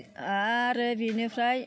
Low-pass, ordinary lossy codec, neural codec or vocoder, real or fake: none; none; none; real